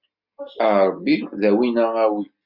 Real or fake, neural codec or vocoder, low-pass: real; none; 5.4 kHz